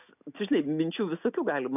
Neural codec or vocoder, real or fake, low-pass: none; real; 3.6 kHz